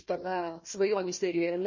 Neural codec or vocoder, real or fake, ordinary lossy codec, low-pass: codec, 16 kHz, 1 kbps, FunCodec, trained on Chinese and English, 50 frames a second; fake; MP3, 32 kbps; 7.2 kHz